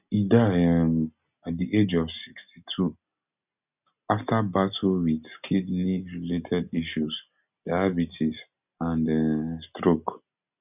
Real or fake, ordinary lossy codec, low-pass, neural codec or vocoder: real; none; 3.6 kHz; none